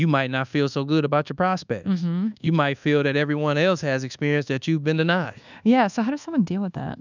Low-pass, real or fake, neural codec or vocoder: 7.2 kHz; fake; codec, 24 kHz, 1.2 kbps, DualCodec